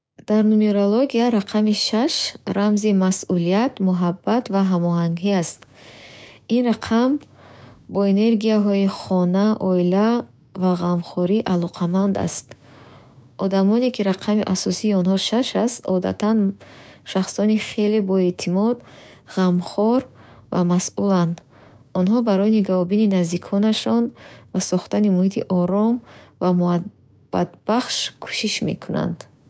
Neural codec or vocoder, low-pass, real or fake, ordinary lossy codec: codec, 16 kHz, 6 kbps, DAC; none; fake; none